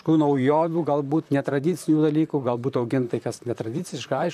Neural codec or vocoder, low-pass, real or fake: vocoder, 44.1 kHz, 128 mel bands, Pupu-Vocoder; 14.4 kHz; fake